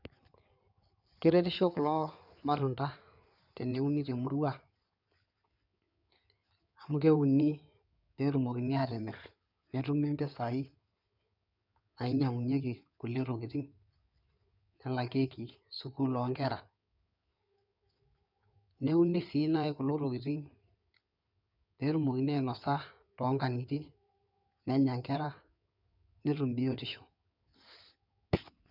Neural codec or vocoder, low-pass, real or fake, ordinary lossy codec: codec, 16 kHz in and 24 kHz out, 2.2 kbps, FireRedTTS-2 codec; 5.4 kHz; fake; none